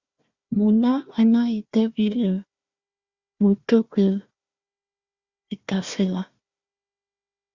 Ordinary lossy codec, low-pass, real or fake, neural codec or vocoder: Opus, 64 kbps; 7.2 kHz; fake; codec, 16 kHz, 1 kbps, FunCodec, trained on Chinese and English, 50 frames a second